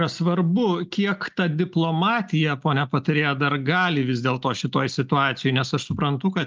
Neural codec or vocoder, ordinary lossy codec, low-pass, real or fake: none; Opus, 24 kbps; 7.2 kHz; real